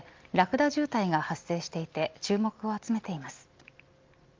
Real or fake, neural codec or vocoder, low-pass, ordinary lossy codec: real; none; 7.2 kHz; Opus, 24 kbps